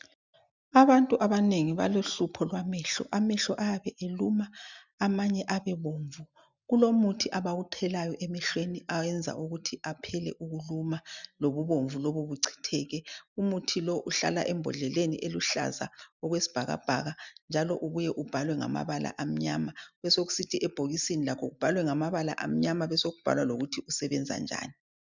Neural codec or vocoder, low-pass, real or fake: none; 7.2 kHz; real